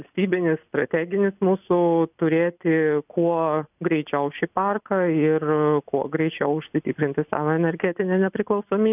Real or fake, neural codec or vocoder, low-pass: real; none; 3.6 kHz